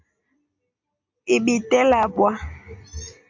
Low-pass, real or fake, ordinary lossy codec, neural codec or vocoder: 7.2 kHz; real; AAC, 48 kbps; none